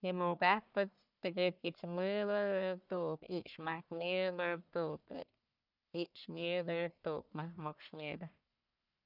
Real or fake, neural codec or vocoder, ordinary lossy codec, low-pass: fake; codec, 44.1 kHz, 1.7 kbps, Pupu-Codec; none; 5.4 kHz